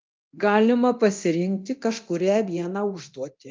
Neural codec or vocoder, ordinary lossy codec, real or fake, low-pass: codec, 24 kHz, 0.9 kbps, DualCodec; Opus, 24 kbps; fake; 7.2 kHz